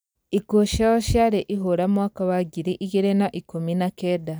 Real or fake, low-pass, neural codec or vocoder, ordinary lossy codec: real; none; none; none